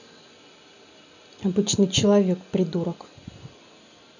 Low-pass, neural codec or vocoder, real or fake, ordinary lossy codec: 7.2 kHz; none; real; none